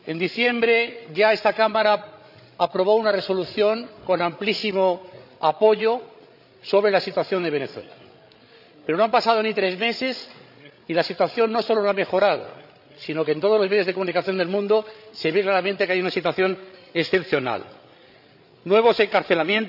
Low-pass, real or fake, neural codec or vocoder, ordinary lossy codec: 5.4 kHz; fake; codec, 16 kHz, 16 kbps, FreqCodec, larger model; none